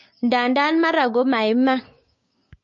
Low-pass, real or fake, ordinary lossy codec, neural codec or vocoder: 7.2 kHz; fake; MP3, 32 kbps; codec, 16 kHz, 6 kbps, DAC